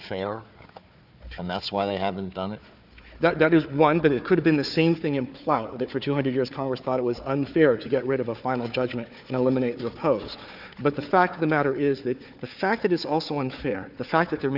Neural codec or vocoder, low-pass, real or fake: codec, 16 kHz, 4 kbps, FunCodec, trained on Chinese and English, 50 frames a second; 5.4 kHz; fake